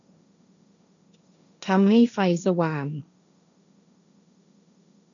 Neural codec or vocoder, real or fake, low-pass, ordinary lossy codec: codec, 16 kHz, 1.1 kbps, Voila-Tokenizer; fake; 7.2 kHz; none